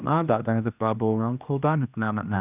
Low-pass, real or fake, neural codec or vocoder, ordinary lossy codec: 3.6 kHz; fake; codec, 16 kHz, 1 kbps, X-Codec, HuBERT features, trained on general audio; none